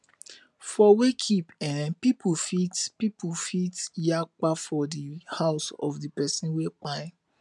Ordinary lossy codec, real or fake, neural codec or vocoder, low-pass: none; real; none; 10.8 kHz